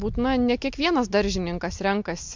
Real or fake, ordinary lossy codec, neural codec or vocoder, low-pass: real; MP3, 64 kbps; none; 7.2 kHz